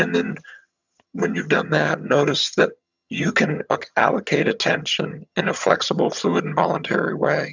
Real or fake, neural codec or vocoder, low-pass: fake; vocoder, 22.05 kHz, 80 mel bands, HiFi-GAN; 7.2 kHz